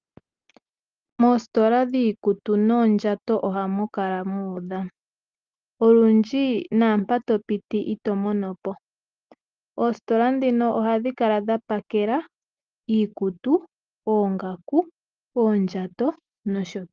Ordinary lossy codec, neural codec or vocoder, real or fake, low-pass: Opus, 32 kbps; none; real; 7.2 kHz